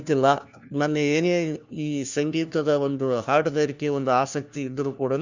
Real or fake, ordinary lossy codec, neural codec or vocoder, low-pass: fake; Opus, 64 kbps; codec, 16 kHz, 1 kbps, FunCodec, trained on LibriTTS, 50 frames a second; 7.2 kHz